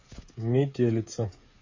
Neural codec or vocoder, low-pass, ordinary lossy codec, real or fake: codec, 16 kHz, 8 kbps, FreqCodec, smaller model; 7.2 kHz; MP3, 32 kbps; fake